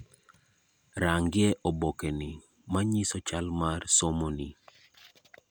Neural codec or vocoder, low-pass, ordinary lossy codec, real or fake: none; none; none; real